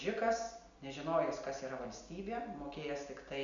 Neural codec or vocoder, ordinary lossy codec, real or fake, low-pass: none; MP3, 96 kbps; real; 7.2 kHz